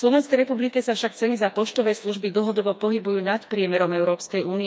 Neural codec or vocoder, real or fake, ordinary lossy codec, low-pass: codec, 16 kHz, 2 kbps, FreqCodec, smaller model; fake; none; none